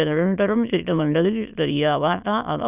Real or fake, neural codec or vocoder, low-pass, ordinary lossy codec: fake; autoencoder, 22.05 kHz, a latent of 192 numbers a frame, VITS, trained on many speakers; 3.6 kHz; none